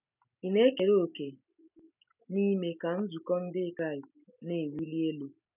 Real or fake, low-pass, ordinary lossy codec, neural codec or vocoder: real; 3.6 kHz; none; none